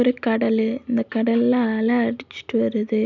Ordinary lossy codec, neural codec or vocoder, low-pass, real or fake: none; none; 7.2 kHz; real